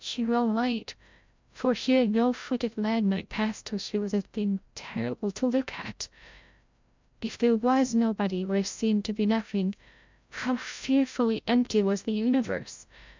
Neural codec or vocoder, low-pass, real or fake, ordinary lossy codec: codec, 16 kHz, 0.5 kbps, FreqCodec, larger model; 7.2 kHz; fake; MP3, 64 kbps